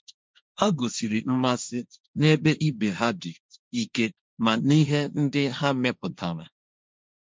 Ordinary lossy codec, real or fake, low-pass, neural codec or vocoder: none; fake; none; codec, 16 kHz, 1.1 kbps, Voila-Tokenizer